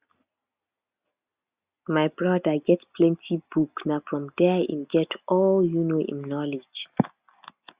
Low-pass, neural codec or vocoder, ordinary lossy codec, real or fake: 3.6 kHz; none; Opus, 64 kbps; real